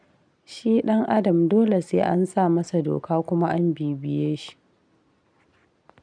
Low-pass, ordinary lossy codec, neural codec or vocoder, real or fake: 9.9 kHz; none; none; real